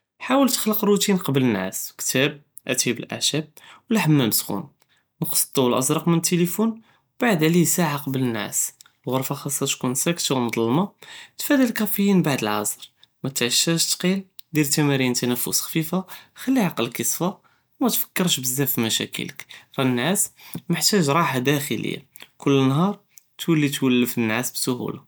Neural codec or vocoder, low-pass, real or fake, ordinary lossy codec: none; none; real; none